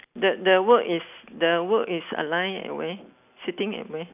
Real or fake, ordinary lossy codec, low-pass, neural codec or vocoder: real; none; 3.6 kHz; none